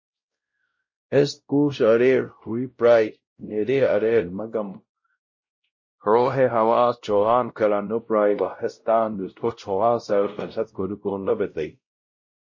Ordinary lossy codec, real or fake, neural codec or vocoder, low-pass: MP3, 32 kbps; fake; codec, 16 kHz, 0.5 kbps, X-Codec, WavLM features, trained on Multilingual LibriSpeech; 7.2 kHz